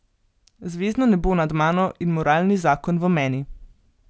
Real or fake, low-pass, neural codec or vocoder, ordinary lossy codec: real; none; none; none